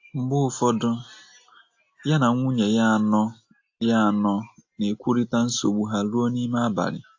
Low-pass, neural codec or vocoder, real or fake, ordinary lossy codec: 7.2 kHz; none; real; AAC, 48 kbps